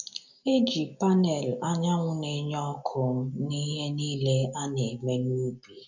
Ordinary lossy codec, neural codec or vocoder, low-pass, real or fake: none; none; 7.2 kHz; real